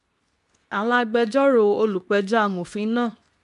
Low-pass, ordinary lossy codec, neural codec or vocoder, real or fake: 10.8 kHz; none; codec, 24 kHz, 0.9 kbps, WavTokenizer, medium speech release version 2; fake